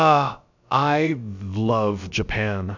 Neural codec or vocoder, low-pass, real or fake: codec, 16 kHz, about 1 kbps, DyCAST, with the encoder's durations; 7.2 kHz; fake